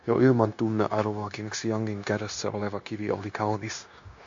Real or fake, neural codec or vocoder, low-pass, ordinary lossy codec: fake; codec, 16 kHz, 0.9 kbps, LongCat-Audio-Codec; 7.2 kHz; MP3, 48 kbps